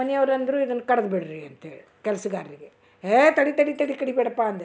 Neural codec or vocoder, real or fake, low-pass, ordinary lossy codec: none; real; none; none